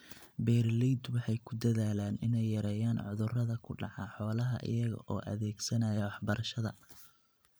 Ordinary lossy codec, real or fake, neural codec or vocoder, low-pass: none; fake; vocoder, 44.1 kHz, 128 mel bands every 512 samples, BigVGAN v2; none